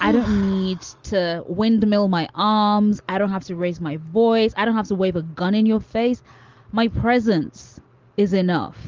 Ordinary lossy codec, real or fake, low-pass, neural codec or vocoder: Opus, 32 kbps; real; 7.2 kHz; none